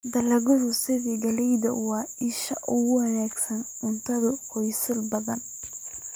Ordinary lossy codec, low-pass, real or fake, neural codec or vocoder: none; none; real; none